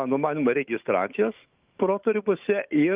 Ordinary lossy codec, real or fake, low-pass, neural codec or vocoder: Opus, 32 kbps; fake; 3.6 kHz; autoencoder, 48 kHz, 128 numbers a frame, DAC-VAE, trained on Japanese speech